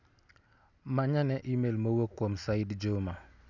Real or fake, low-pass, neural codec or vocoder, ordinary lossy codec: real; 7.2 kHz; none; none